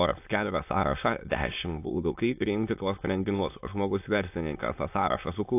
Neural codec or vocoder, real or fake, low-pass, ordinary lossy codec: autoencoder, 22.05 kHz, a latent of 192 numbers a frame, VITS, trained on many speakers; fake; 3.6 kHz; AAC, 32 kbps